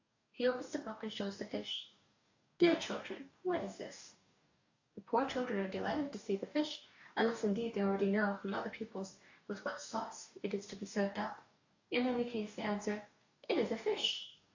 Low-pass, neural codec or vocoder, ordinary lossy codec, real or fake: 7.2 kHz; codec, 44.1 kHz, 2.6 kbps, DAC; AAC, 48 kbps; fake